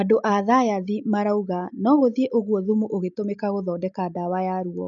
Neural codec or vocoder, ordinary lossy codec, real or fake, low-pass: none; none; real; 7.2 kHz